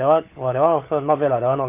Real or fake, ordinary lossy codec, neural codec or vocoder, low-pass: fake; none; codec, 16 kHz, 16 kbps, FunCodec, trained on LibriTTS, 50 frames a second; 3.6 kHz